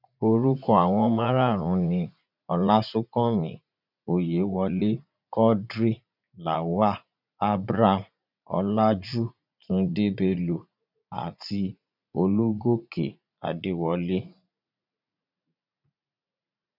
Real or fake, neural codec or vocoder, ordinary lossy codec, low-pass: fake; vocoder, 44.1 kHz, 80 mel bands, Vocos; none; 5.4 kHz